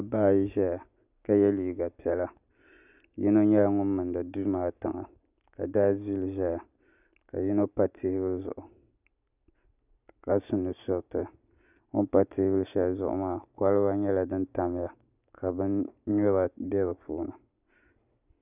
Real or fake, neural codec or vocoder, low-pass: real; none; 3.6 kHz